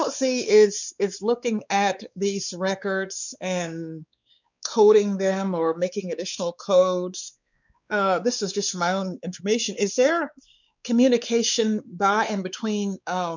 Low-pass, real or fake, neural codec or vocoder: 7.2 kHz; fake; codec, 16 kHz, 4 kbps, X-Codec, WavLM features, trained on Multilingual LibriSpeech